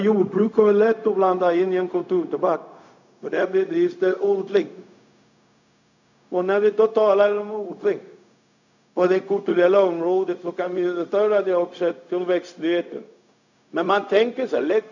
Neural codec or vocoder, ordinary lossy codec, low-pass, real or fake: codec, 16 kHz, 0.4 kbps, LongCat-Audio-Codec; none; 7.2 kHz; fake